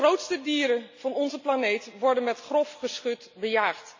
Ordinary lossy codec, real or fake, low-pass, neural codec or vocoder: AAC, 48 kbps; real; 7.2 kHz; none